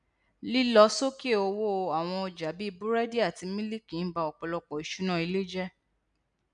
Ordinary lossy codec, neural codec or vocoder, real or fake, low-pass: none; none; real; 9.9 kHz